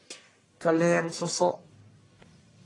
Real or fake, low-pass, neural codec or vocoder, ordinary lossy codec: fake; 10.8 kHz; codec, 44.1 kHz, 1.7 kbps, Pupu-Codec; AAC, 32 kbps